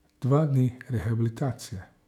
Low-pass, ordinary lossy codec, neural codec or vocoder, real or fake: 19.8 kHz; none; autoencoder, 48 kHz, 128 numbers a frame, DAC-VAE, trained on Japanese speech; fake